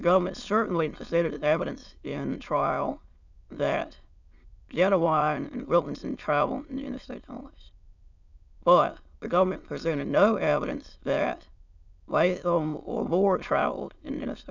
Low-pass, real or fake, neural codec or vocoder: 7.2 kHz; fake; autoencoder, 22.05 kHz, a latent of 192 numbers a frame, VITS, trained on many speakers